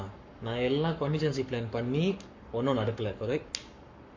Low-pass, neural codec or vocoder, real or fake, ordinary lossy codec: 7.2 kHz; codec, 44.1 kHz, 7.8 kbps, Pupu-Codec; fake; MP3, 48 kbps